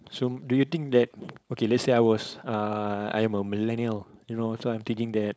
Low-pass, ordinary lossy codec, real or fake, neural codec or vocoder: none; none; fake; codec, 16 kHz, 4.8 kbps, FACodec